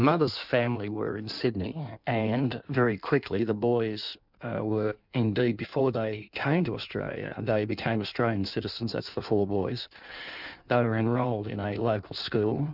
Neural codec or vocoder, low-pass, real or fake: codec, 16 kHz in and 24 kHz out, 1.1 kbps, FireRedTTS-2 codec; 5.4 kHz; fake